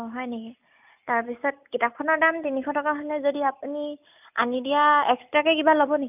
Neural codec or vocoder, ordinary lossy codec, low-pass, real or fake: none; none; 3.6 kHz; real